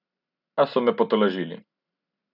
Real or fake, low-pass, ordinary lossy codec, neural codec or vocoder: real; 5.4 kHz; none; none